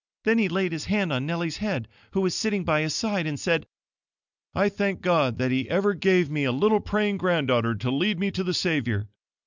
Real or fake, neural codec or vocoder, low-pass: real; none; 7.2 kHz